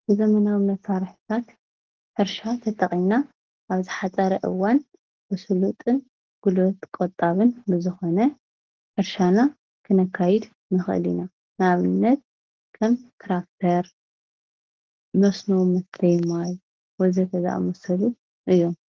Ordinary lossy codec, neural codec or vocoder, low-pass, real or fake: Opus, 16 kbps; none; 7.2 kHz; real